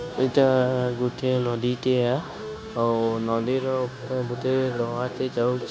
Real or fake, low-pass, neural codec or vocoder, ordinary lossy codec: fake; none; codec, 16 kHz, 0.9 kbps, LongCat-Audio-Codec; none